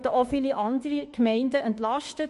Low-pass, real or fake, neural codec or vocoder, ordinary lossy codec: 14.4 kHz; fake; autoencoder, 48 kHz, 32 numbers a frame, DAC-VAE, trained on Japanese speech; MP3, 48 kbps